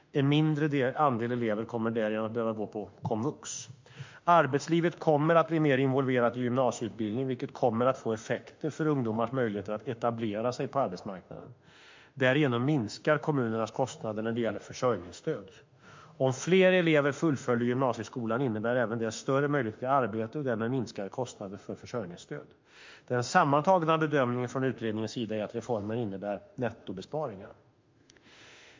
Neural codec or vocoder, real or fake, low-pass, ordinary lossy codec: autoencoder, 48 kHz, 32 numbers a frame, DAC-VAE, trained on Japanese speech; fake; 7.2 kHz; MP3, 48 kbps